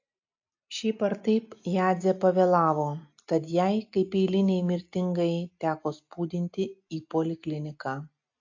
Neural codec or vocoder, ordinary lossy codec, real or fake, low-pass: none; AAC, 48 kbps; real; 7.2 kHz